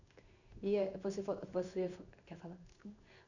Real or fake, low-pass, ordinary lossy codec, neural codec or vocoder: fake; 7.2 kHz; none; codec, 16 kHz in and 24 kHz out, 1 kbps, XY-Tokenizer